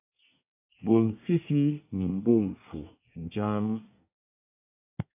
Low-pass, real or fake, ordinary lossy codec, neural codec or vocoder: 3.6 kHz; fake; AAC, 32 kbps; codec, 24 kHz, 1 kbps, SNAC